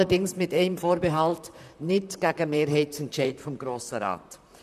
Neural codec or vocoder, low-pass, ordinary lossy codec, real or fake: vocoder, 44.1 kHz, 128 mel bands, Pupu-Vocoder; 14.4 kHz; none; fake